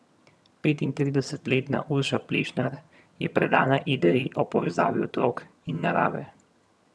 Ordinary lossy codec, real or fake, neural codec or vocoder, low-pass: none; fake; vocoder, 22.05 kHz, 80 mel bands, HiFi-GAN; none